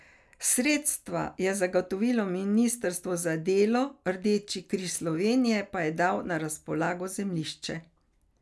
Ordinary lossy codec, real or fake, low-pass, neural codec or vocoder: none; real; none; none